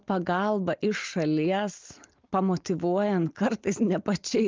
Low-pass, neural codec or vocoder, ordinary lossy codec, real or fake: 7.2 kHz; none; Opus, 24 kbps; real